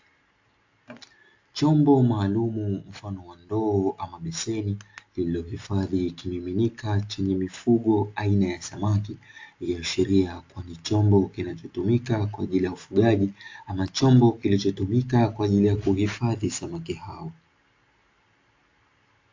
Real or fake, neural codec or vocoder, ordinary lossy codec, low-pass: real; none; AAC, 48 kbps; 7.2 kHz